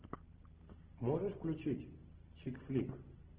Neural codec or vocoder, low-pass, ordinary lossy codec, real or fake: none; 3.6 kHz; Opus, 16 kbps; real